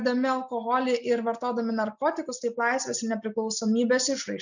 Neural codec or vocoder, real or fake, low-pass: none; real; 7.2 kHz